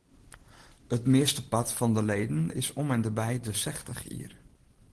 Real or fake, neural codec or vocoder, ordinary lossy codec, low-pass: real; none; Opus, 16 kbps; 10.8 kHz